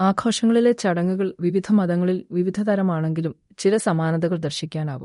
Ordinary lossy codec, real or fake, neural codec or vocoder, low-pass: MP3, 48 kbps; fake; codec, 24 kHz, 0.9 kbps, DualCodec; 10.8 kHz